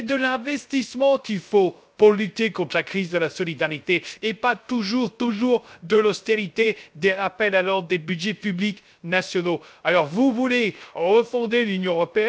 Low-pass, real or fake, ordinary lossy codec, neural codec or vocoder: none; fake; none; codec, 16 kHz, 0.3 kbps, FocalCodec